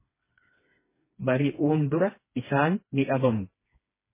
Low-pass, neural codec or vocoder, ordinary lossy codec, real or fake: 3.6 kHz; codec, 16 kHz, 2 kbps, FreqCodec, smaller model; MP3, 16 kbps; fake